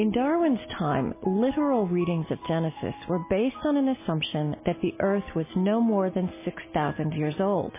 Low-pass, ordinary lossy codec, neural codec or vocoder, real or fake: 3.6 kHz; MP3, 16 kbps; none; real